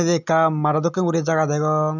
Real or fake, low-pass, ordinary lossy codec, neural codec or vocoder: real; 7.2 kHz; none; none